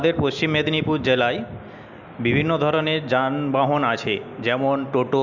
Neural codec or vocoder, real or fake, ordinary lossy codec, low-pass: none; real; none; 7.2 kHz